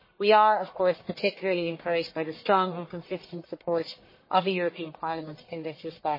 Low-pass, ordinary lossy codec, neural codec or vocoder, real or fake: 5.4 kHz; MP3, 24 kbps; codec, 44.1 kHz, 1.7 kbps, Pupu-Codec; fake